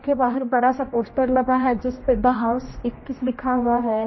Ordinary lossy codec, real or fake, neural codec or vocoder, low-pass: MP3, 24 kbps; fake; codec, 16 kHz, 1 kbps, X-Codec, HuBERT features, trained on general audio; 7.2 kHz